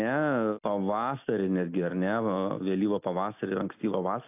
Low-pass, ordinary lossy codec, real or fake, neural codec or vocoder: 3.6 kHz; AAC, 32 kbps; real; none